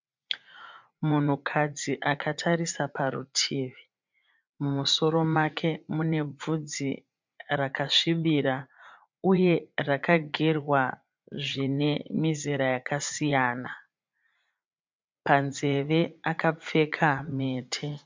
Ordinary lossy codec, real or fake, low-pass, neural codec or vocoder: MP3, 64 kbps; fake; 7.2 kHz; vocoder, 44.1 kHz, 80 mel bands, Vocos